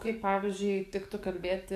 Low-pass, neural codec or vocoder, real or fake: 14.4 kHz; codec, 44.1 kHz, 7.8 kbps, DAC; fake